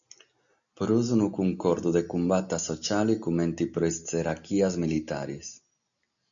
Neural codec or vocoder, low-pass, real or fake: none; 7.2 kHz; real